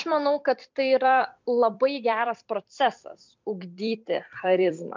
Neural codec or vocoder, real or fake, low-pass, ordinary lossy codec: vocoder, 44.1 kHz, 128 mel bands every 256 samples, BigVGAN v2; fake; 7.2 kHz; MP3, 64 kbps